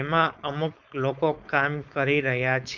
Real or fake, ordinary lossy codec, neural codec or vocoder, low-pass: fake; none; codec, 16 kHz, 4.8 kbps, FACodec; 7.2 kHz